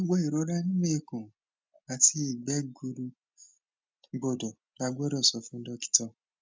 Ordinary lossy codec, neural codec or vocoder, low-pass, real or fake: none; none; none; real